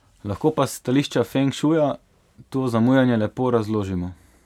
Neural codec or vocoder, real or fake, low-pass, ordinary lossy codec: none; real; 19.8 kHz; none